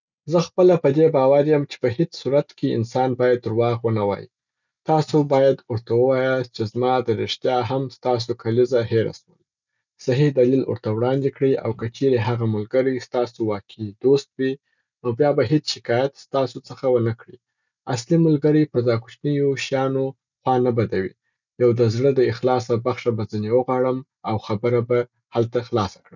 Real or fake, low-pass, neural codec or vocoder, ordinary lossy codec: real; 7.2 kHz; none; none